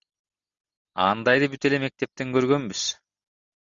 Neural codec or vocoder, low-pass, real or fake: none; 7.2 kHz; real